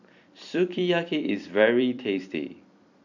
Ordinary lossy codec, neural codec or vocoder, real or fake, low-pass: none; vocoder, 44.1 kHz, 128 mel bands every 256 samples, BigVGAN v2; fake; 7.2 kHz